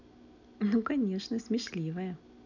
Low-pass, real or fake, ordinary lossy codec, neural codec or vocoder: 7.2 kHz; real; none; none